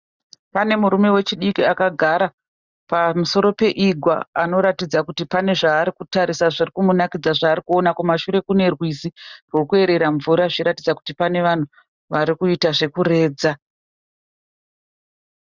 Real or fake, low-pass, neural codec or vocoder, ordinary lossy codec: real; 7.2 kHz; none; Opus, 64 kbps